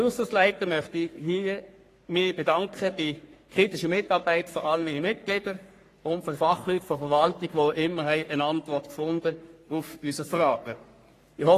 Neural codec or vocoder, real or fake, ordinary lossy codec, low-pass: codec, 44.1 kHz, 3.4 kbps, Pupu-Codec; fake; AAC, 48 kbps; 14.4 kHz